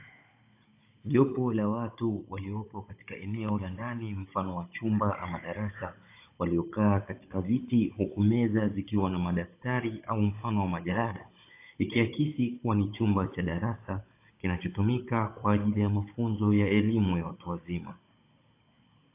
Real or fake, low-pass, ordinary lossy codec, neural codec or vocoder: fake; 3.6 kHz; AAC, 24 kbps; codec, 16 kHz, 16 kbps, FunCodec, trained on Chinese and English, 50 frames a second